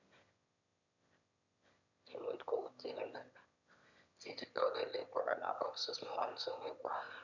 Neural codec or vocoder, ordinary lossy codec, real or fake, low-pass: autoencoder, 22.05 kHz, a latent of 192 numbers a frame, VITS, trained on one speaker; none; fake; 7.2 kHz